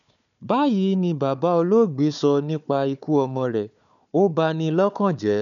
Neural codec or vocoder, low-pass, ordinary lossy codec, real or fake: codec, 16 kHz, 4 kbps, FunCodec, trained on Chinese and English, 50 frames a second; 7.2 kHz; none; fake